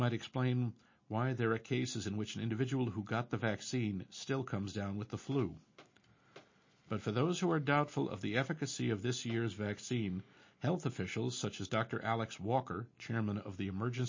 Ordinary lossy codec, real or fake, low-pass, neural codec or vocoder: MP3, 32 kbps; real; 7.2 kHz; none